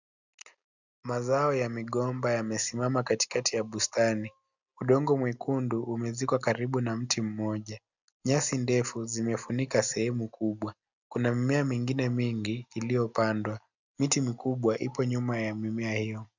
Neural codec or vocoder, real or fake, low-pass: none; real; 7.2 kHz